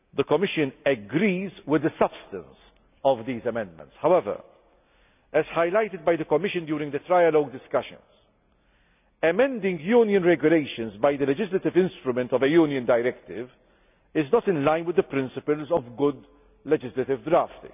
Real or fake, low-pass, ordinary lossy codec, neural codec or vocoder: real; 3.6 kHz; none; none